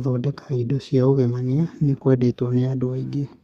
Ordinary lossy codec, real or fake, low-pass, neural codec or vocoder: Opus, 64 kbps; fake; 14.4 kHz; codec, 32 kHz, 1.9 kbps, SNAC